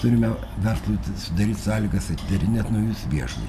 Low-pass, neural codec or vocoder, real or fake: 14.4 kHz; none; real